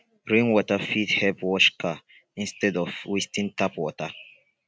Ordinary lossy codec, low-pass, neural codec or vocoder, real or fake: none; none; none; real